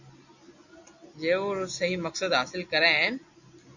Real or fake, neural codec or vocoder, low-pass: real; none; 7.2 kHz